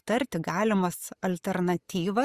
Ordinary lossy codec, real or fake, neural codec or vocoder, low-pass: Opus, 64 kbps; real; none; 14.4 kHz